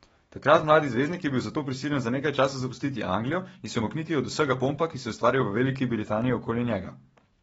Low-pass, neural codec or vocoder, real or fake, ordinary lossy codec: 19.8 kHz; codec, 44.1 kHz, 7.8 kbps, DAC; fake; AAC, 24 kbps